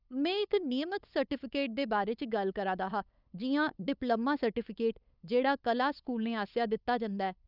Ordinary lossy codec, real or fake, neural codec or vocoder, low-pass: none; fake; codec, 16 kHz, 8 kbps, FunCodec, trained on LibriTTS, 25 frames a second; 5.4 kHz